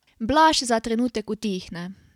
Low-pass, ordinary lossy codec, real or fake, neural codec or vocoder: 19.8 kHz; none; real; none